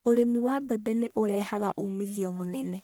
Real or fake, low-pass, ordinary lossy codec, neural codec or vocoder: fake; none; none; codec, 44.1 kHz, 1.7 kbps, Pupu-Codec